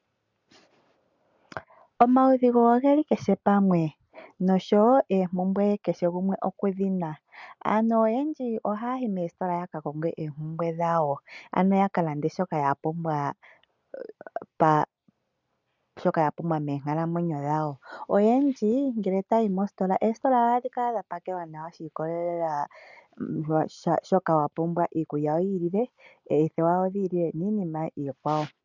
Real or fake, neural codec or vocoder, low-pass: real; none; 7.2 kHz